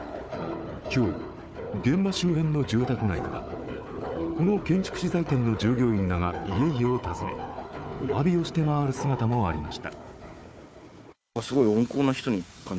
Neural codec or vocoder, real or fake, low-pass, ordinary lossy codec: codec, 16 kHz, 4 kbps, FunCodec, trained on Chinese and English, 50 frames a second; fake; none; none